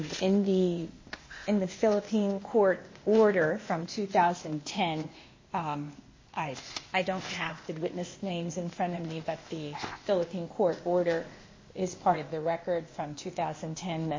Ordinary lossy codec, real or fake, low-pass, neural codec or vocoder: MP3, 32 kbps; fake; 7.2 kHz; codec, 16 kHz, 0.8 kbps, ZipCodec